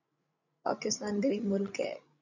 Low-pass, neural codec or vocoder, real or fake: 7.2 kHz; codec, 16 kHz, 16 kbps, FreqCodec, larger model; fake